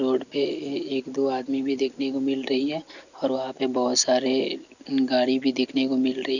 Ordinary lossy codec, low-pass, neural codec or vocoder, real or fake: none; 7.2 kHz; none; real